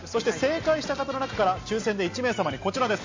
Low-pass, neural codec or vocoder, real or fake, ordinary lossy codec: 7.2 kHz; none; real; MP3, 48 kbps